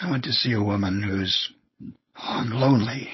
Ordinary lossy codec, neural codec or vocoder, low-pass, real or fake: MP3, 24 kbps; codec, 16 kHz, 4.8 kbps, FACodec; 7.2 kHz; fake